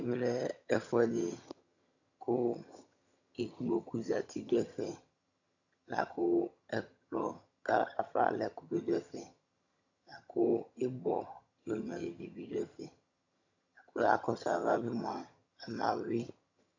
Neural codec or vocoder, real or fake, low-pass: vocoder, 22.05 kHz, 80 mel bands, HiFi-GAN; fake; 7.2 kHz